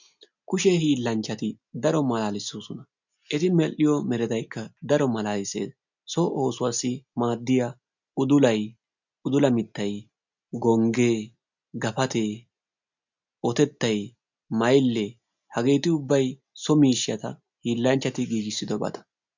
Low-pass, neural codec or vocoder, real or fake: 7.2 kHz; none; real